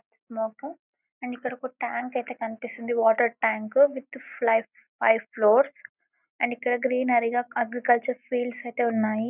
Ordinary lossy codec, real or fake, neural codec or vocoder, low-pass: none; real; none; 3.6 kHz